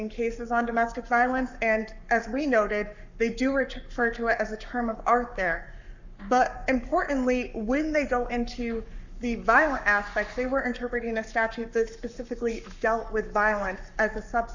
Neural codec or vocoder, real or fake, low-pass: codec, 44.1 kHz, 7.8 kbps, Pupu-Codec; fake; 7.2 kHz